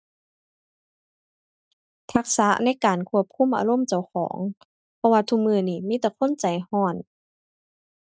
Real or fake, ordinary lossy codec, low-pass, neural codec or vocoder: real; none; none; none